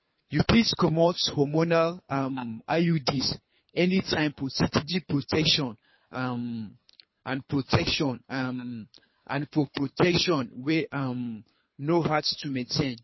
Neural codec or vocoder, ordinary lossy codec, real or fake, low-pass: codec, 24 kHz, 3 kbps, HILCodec; MP3, 24 kbps; fake; 7.2 kHz